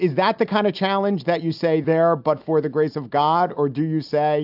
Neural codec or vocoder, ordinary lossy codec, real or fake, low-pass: none; AAC, 48 kbps; real; 5.4 kHz